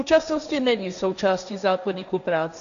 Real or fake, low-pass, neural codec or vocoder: fake; 7.2 kHz; codec, 16 kHz, 1.1 kbps, Voila-Tokenizer